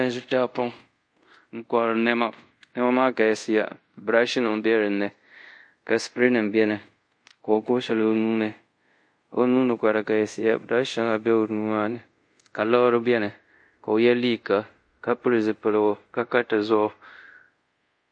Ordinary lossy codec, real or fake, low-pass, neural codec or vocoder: MP3, 48 kbps; fake; 9.9 kHz; codec, 24 kHz, 0.5 kbps, DualCodec